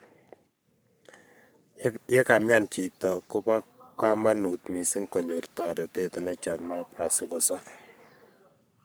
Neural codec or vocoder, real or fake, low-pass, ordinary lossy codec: codec, 44.1 kHz, 3.4 kbps, Pupu-Codec; fake; none; none